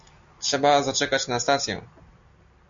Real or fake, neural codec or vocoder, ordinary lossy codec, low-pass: real; none; MP3, 64 kbps; 7.2 kHz